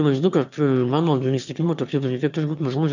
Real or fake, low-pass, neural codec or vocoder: fake; 7.2 kHz; autoencoder, 22.05 kHz, a latent of 192 numbers a frame, VITS, trained on one speaker